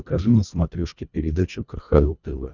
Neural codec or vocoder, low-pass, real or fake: codec, 24 kHz, 1.5 kbps, HILCodec; 7.2 kHz; fake